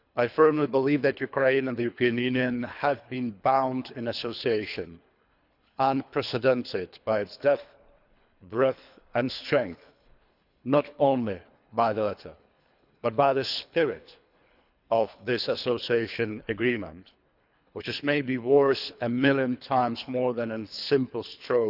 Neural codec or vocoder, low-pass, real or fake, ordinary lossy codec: codec, 24 kHz, 3 kbps, HILCodec; 5.4 kHz; fake; none